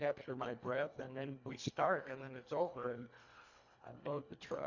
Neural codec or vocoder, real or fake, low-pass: codec, 24 kHz, 1.5 kbps, HILCodec; fake; 7.2 kHz